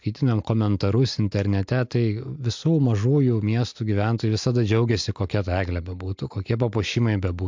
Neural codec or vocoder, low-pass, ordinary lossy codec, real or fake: none; 7.2 kHz; MP3, 64 kbps; real